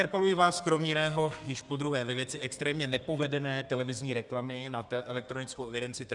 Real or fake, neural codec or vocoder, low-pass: fake; codec, 32 kHz, 1.9 kbps, SNAC; 10.8 kHz